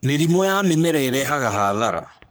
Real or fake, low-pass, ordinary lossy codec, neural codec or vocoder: fake; none; none; codec, 44.1 kHz, 3.4 kbps, Pupu-Codec